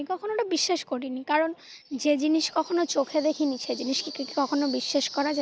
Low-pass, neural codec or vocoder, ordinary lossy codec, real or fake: none; none; none; real